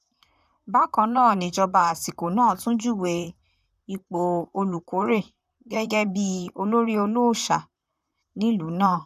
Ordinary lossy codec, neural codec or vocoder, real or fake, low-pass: none; vocoder, 44.1 kHz, 128 mel bands, Pupu-Vocoder; fake; 14.4 kHz